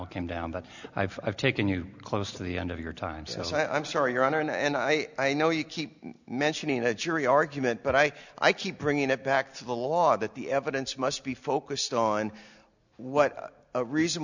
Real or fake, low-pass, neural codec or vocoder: real; 7.2 kHz; none